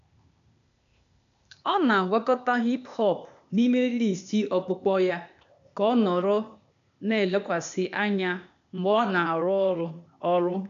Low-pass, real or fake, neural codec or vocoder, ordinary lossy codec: 7.2 kHz; fake; codec, 16 kHz, 0.8 kbps, ZipCodec; none